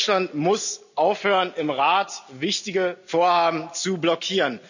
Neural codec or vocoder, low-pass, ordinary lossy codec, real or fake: none; 7.2 kHz; none; real